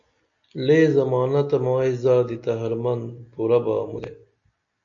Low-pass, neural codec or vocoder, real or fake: 7.2 kHz; none; real